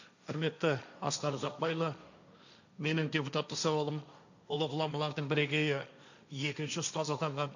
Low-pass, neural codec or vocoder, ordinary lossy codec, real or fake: 7.2 kHz; codec, 16 kHz, 1.1 kbps, Voila-Tokenizer; none; fake